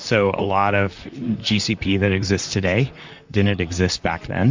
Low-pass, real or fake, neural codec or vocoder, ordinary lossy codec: 7.2 kHz; fake; vocoder, 44.1 kHz, 128 mel bands, Pupu-Vocoder; MP3, 64 kbps